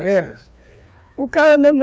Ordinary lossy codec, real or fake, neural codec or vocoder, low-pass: none; fake; codec, 16 kHz, 2 kbps, FreqCodec, larger model; none